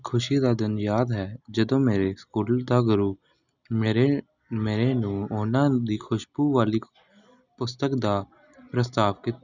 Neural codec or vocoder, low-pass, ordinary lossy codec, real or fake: none; 7.2 kHz; none; real